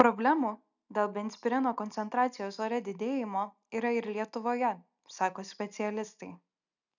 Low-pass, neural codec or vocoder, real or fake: 7.2 kHz; none; real